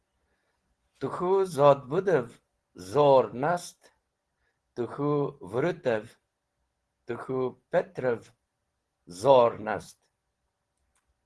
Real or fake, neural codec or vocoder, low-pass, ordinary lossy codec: real; none; 10.8 kHz; Opus, 16 kbps